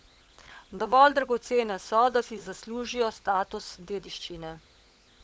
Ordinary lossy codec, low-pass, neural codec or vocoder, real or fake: none; none; codec, 16 kHz, 16 kbps, FunCodec, trained on LibriTTS, 50 frames a second; fake